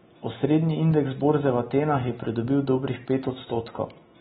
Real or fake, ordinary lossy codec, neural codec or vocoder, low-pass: real; AAC, 16 kbps; none; 19.8 kHz